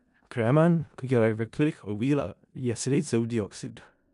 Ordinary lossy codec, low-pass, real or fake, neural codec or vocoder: none; 10.8 kHz; fake; codec, 16 kHz in and 24 kHz out, 0.4 kbps, LongCat-Audio-Codec, four codebook decoder